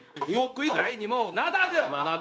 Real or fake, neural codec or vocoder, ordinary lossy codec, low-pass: fake; codec, 16 kHz, 0.9 kbps, LongCat-Audio-Codec; none; none